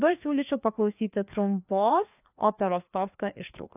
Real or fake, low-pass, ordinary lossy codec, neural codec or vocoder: fake; 3.6 kHz; AAC, 32 kbps; codec, 16 kHz, 2 kbps, FreqCodec, larger model